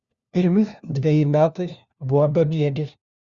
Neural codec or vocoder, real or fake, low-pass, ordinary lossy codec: codec, 16 kHz, 1 kbps, FunCodec, trained on LibriTTS, 50 frames a second; fake; 7.2 kHz; Opus, 64 kbps